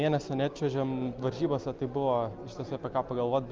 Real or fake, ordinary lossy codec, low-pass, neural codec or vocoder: real; Opus, 24 kbps; 7.2 kHz; none